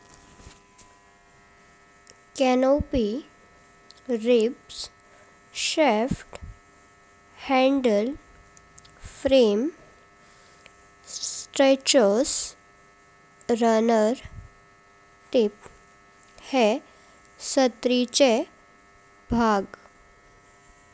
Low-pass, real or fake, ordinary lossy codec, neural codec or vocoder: none; real; none; none